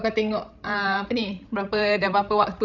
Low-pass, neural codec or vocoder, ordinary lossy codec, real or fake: 7.2 kHz; codec, 16 kHz, 16 kbps, FreqCodec, larger model; none; fake